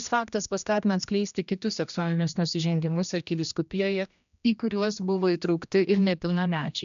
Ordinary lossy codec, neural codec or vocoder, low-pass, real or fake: MP3, 96 kbps; codec, 16 kHz, 1 kbps, X-Codec, HuBERT features, trained on general audio; 7.2 kHz; fake